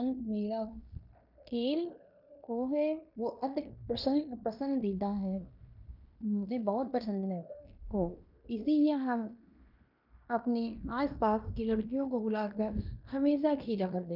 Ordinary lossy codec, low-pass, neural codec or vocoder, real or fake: none; 5.4 kHz; codec, 16 kHz in and 24 kHz out, 0.9 kbps, LongCat-Audio-Codec, fine tuned four codebook decoder; fake